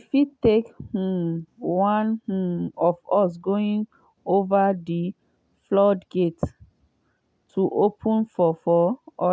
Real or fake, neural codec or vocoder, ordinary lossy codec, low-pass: real; none; none; none